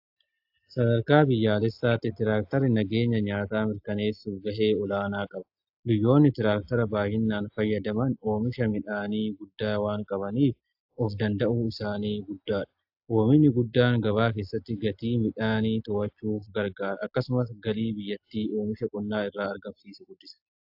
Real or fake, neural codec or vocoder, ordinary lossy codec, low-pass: real; none; AAC, 48 kbps; 5.4 kHz